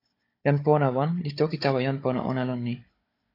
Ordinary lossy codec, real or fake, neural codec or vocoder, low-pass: AAC, 32 kbps; fake; codec, 16 kHz, 16 kbps, FunCodec, trained on Chinese and English, 50 frames a second; 5.4 kHz